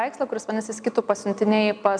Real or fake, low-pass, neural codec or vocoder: real; 9.9 kHz; none